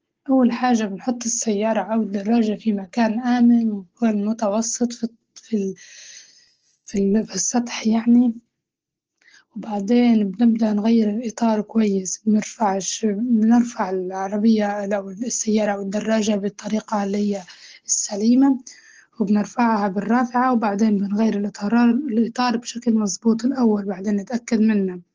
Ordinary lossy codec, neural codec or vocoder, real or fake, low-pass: Opus, 16 kbps; none; real; 7.2 kHz